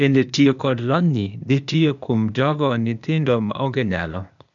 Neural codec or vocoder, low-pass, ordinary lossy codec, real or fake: codec, 16 kHz, 0.8 kbps, ZipCodec; 7.2 kHz; none; fake